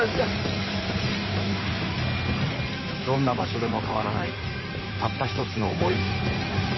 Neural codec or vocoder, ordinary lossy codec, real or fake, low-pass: codec, 16 kHz in and 24 kHz out, 2.2 kbps, FireRedTTS-2 codec; MP3, 24 kbps; fake; 7.2 kHz